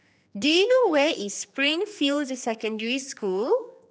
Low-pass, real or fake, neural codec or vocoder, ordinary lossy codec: none; fake; codec, 16 kHz, 2 kbps, X-Codec, HuBERT features, trained on general audio; none